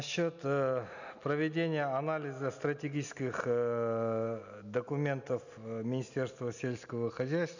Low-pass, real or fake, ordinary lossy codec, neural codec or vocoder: 7.2 kHz; real; none; none